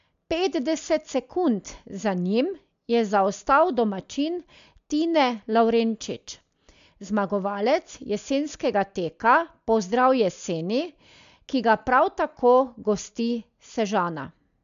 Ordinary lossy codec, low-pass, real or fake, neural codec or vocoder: MP3, 64 kbps; 7.2 kHz; real; none